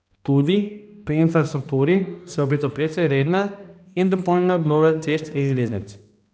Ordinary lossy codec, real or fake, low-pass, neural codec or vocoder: none; fake; none; codec, 16 kHz, 1 kbps, X-Codec, HuBERT features, trained on balanced general audio